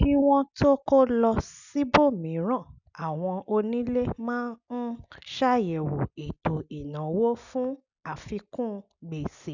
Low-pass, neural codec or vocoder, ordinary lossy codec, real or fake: 7.2 kHz; none; none; real